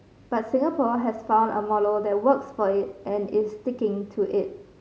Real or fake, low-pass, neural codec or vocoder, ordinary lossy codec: real; none; none; none